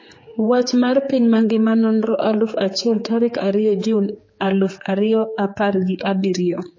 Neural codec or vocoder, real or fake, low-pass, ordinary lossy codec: codec, 16 kHz, 4 kbps, X-Codec, HuBERT features, trained on general audio; fake; 7.2 kHz; MP3, 32 kbps